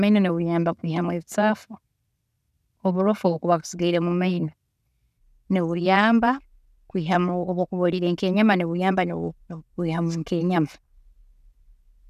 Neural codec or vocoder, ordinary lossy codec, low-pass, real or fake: none; none; 14.4 kHz; real